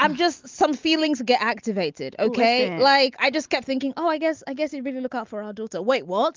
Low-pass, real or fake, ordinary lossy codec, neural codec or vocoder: 7.2 kHz; real; Opus, 24 kbps; none